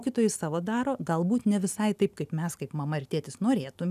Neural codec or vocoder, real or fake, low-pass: none; real; 14.4 kHz